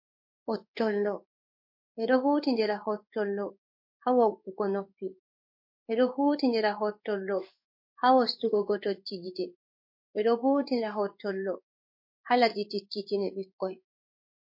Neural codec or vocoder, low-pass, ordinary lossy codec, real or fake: codec, 16 kHz in and 24 kHz out, 1 kbps, XY-Tokenizer; 5.4 kHz; MP3, 32 kbps; fake